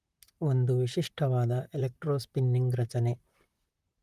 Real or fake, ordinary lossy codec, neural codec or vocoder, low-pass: fake; Opus, 32 kbps; vocoder, 44.1 kHz, 128 mel bands, Pupu-Vocoder; 14.4 kHz